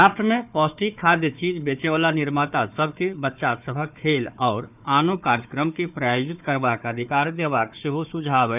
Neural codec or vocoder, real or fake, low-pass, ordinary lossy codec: codec, 16 kHz, 4 kbps, FunCodec, trained on Chinese and English, 50 frames a second; fake; 3.6 kHz; none